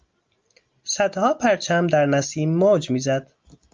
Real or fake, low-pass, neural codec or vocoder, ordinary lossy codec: real; 7.2 kHz; none; Opus, 24 kbps